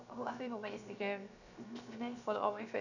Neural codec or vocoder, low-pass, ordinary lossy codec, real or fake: codec, 16 kHz, 0.7 kbps, FocalCodec; 7.2 kHz; none; fake